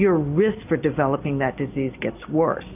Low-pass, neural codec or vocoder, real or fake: 3.6 kHz; none; real